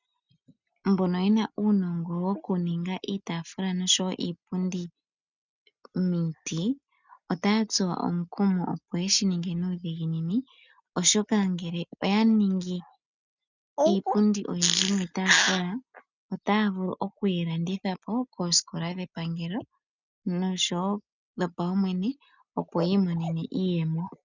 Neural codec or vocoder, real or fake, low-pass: none; real; 7.2 kHz